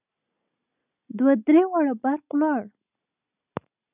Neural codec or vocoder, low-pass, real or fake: none; 3.6 kHz; real